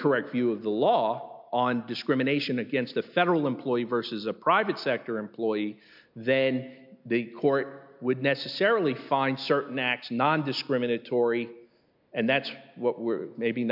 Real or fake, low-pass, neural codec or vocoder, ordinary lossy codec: real; 5.4 kHz; none; MP3, 48 kbps